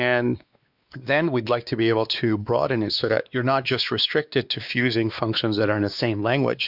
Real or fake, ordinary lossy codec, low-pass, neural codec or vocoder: fake; Opus, 64 kbps; 5.4 kHz; codec, 16 kHz, 2 kbps, X-Codec, WavLM features, trained on Multilingual LibriSpeech